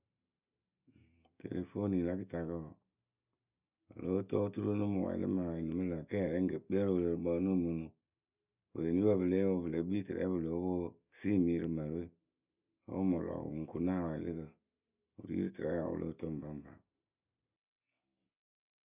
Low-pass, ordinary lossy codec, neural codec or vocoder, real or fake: 3.6 kHz; none; none; real